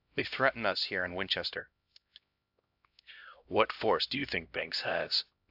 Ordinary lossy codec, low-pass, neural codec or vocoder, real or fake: AAC, 48 kbps; 5.4 kHz; codec, 16 kHz, 1 kbps, X-Codec, HuBERT features, trained on LibriSpeech; fake